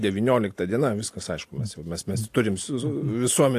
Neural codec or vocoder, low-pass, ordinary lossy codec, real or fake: none; 14.4 kHz; AAC, 64 kbps; real